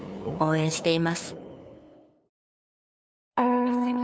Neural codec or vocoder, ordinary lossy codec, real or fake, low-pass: codec, 16 kHz, 2 kbps, FunCodec, trained on LibriTTS, 25 frames a second; none; fake; none